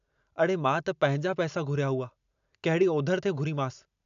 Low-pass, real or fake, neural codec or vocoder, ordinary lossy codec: 7.2 kHz; real; none; none